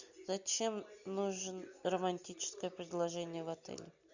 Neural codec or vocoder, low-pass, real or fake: none; 7.2 kHz; real